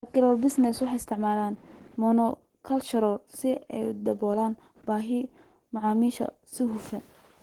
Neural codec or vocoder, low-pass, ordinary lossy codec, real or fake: codec, 44.1 kHz, 7.8 kbps, Pupu-Codec; 19.8 kHz; Opus, 16 kbps; fake